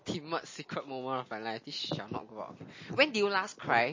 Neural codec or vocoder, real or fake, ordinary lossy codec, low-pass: none; real; MP3, 32 kbps; 7.2 kHz